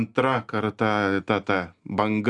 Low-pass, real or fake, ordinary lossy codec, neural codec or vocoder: 10.8 kHz; real; Opus, 64 kbps; none